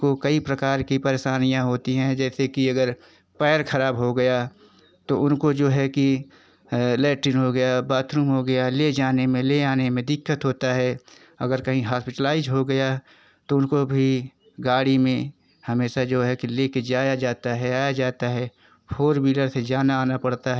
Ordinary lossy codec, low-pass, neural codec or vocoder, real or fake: none; none; none; real